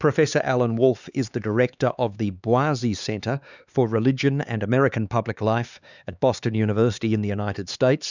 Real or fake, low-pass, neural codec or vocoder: fake; 7.2 kHz; codec, 16 kHz, 4 kbps, X-Codec, HuBERT features, trained on LibriSpeech